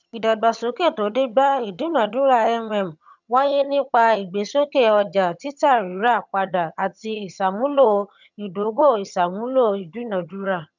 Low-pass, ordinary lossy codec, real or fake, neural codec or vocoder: 7.2 kHz; none; fake; vocoder, 22.05 kHz, 80 mel bands, HiFi-GAN